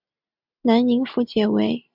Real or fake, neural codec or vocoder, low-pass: real; none; 5.4 kHz